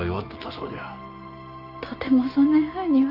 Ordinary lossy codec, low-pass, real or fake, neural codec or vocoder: Opus, 32 kbps; 5.4 kHz; real; none